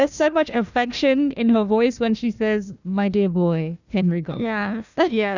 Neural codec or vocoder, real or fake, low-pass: codec, 16 kHz, 1 kbps, FunCodec, trained on Chinese and English, 50 frames a second; fake; 7.2 kHz